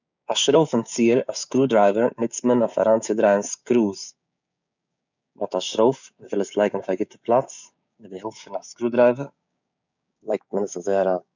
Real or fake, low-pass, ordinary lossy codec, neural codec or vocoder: fake; 7.2 kHz; none; codec, 16 kHz, 6 kbps, DAC